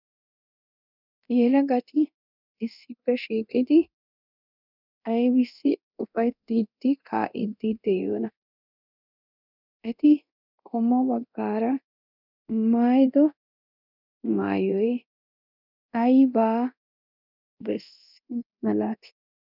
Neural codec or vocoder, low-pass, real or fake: codec, 24 kHz, 0.9 kbps, DualCodec; 5.4 kHz; fake